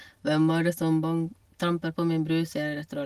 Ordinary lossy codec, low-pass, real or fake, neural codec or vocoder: Opus, 24 kbps; 14.4 kHz; real; none